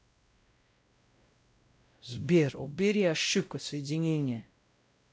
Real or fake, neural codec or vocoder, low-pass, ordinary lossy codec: fake; codec, 16 kHz, 0.5 kbps, X-Codec, WavLM features, trained on Multilingual LibriSpeech; none; none